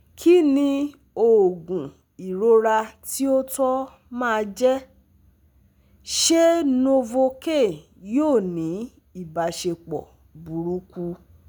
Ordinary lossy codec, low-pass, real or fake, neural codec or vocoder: none; none; real; none